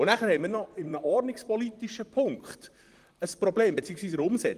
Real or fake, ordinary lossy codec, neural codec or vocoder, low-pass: fake; Opus, 24 kbps; vocoder, 44.1 kHz, 128 mel bands, Pupu-Vocoder; 14.4 kHz